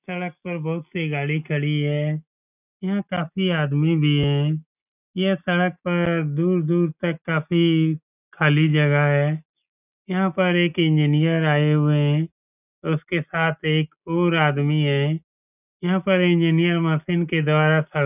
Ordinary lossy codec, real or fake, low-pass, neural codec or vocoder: none; real; 3.6 kHz; none